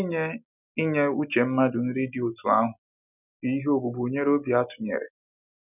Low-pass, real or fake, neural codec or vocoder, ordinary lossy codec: 3.6 kHz; real; none; none